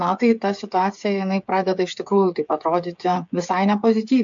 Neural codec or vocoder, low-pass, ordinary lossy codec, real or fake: codec, 16 kHz, 8 kbps, FreqCodec, smaller model; 7.2 kHz; AAC, 64 kbps; fake